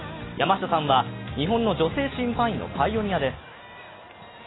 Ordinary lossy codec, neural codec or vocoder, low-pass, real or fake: AAC, 16 kbps; none; 7.2 kHz; real